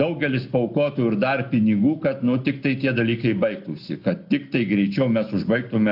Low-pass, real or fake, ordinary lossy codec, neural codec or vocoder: 5.4 kHz; real; AAC, 48 kbps; none